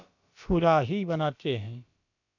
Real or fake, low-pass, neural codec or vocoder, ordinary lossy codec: fake; 7.2 kHz; codec, 16 kHz, about 1 kbps, DyCAST, with the encoder's durations; none